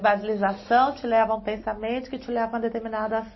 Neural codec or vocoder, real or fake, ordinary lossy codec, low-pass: none; real; MP3, 24 kbps; 7.2 kHz